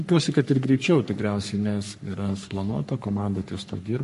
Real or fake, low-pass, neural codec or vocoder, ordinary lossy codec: fake; 14.4 kHz; codec, 44.1 kHz, 3.4 kbps, Pupu-Codec; MP3, 48 kbps